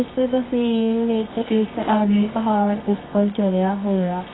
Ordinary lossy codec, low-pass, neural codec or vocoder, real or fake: AAC, 16 kbps; 7.2 kHz; codec, 24 kHz, 0.9 kbps, WavTokenizer, medium music audio release; fake